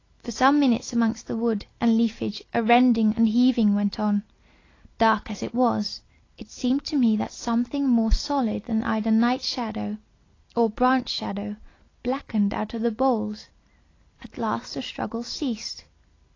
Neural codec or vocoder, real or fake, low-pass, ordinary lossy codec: none; real; 7.2 kHz; AAC, 32 kbps